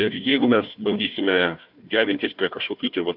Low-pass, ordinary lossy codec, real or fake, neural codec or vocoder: 5.4 kHz; AAC, 48 kbps; fake; codec, 16 kHz, 1 kbps, FunCodec, trained on Chinese and English, 50 frames a second